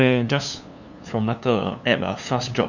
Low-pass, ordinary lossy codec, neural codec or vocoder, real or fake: 7.2 kHz; none; codec, 16 kHz, 2 kbps, FunCodec, trained on LibriTTS, 25 frames a second; fake